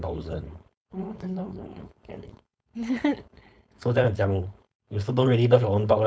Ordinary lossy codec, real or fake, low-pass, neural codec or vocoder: none; fake; none; codec, 16 kHz, 4.8 kbps, FACodec